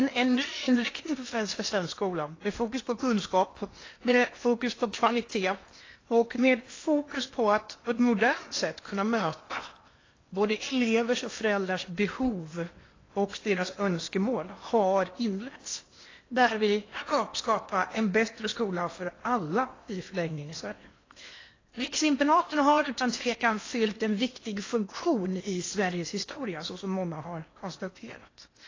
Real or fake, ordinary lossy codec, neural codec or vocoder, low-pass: fake; AAC, 32 kbps; codec, 16 kHz in and 24 kHz out, 0.8 kbps, FocalCodec, streaming, 65536 codes; 7.2 kHz